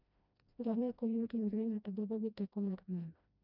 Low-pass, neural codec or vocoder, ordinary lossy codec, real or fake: 5.4 kHz; codec, 16 kHz, 1 kbps, FreqCodec, smaller model; none; fake